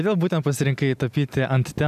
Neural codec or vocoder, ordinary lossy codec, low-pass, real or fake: none; AAC, 96 kbps; 14.4 kHz; real